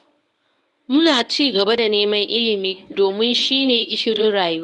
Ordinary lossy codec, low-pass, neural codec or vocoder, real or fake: none; 10.8 kHz; codec, 24 kHz, 0.9 kbps, WavTokenizer, medium speech release version 1; fake